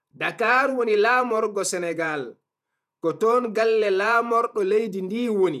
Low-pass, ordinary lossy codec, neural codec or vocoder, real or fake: 14.4 kHz; none; vocoder, 48 kHz, 128 mel bands, Vocos; fake